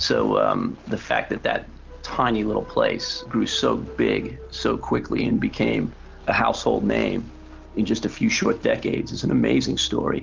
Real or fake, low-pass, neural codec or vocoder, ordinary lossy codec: real; 7.2 kHz; none; Opus, 24 kbps